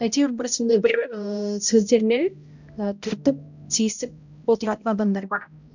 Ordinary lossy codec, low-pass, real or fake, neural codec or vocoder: none; 7.2 kHz; fake; codec, 16 kHz, 0.5 kbps, X-Codec, HuBERT features, trained on balanced general audio